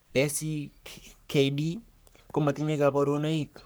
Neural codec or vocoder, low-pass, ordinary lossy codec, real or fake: codec, 44.1 kHz, 3.4 kbps, Pupu-Codec; none; none; fake